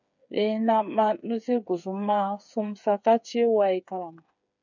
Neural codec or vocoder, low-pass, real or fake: codec, 16 kHz, 8 kbps, FreqCodec, smaller model; 7.2 kHz; fake